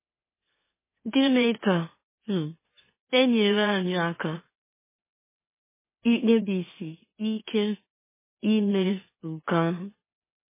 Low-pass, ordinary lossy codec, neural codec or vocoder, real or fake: 3.6 kHz; MP3, 16 kbps; autoencoder, 44.1 kHz, a latent of 192 numbers a frame, MeloTTS; fake